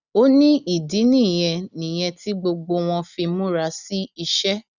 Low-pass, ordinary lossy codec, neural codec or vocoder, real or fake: 7.2 kHz; none; none; real